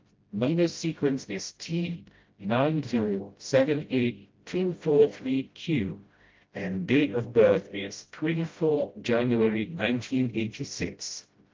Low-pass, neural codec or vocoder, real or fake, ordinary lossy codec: 7.2 kHz; codec, 16 kHz, 0.5 kbps, FreqCodec, smaller model; fake; Opus, 32 kbps